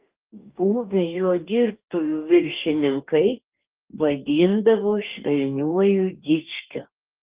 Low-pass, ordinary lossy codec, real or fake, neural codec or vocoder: 3.6 kHz; Opus, 32 kbps; fake; codec, 44.1 kHz, 2.6 kbps, DAC